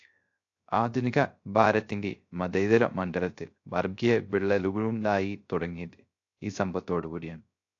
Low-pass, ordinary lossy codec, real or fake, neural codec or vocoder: 7.2 kHz; AAC, 48 kbps; fake; codec, 16 kHz, 0.3 kbps, FocalCodec